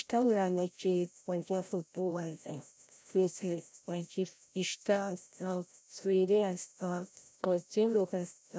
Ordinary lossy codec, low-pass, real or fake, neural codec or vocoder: none; none; fake; codec, 16 kHz, 0.5 kbps, FreqCodec, larger model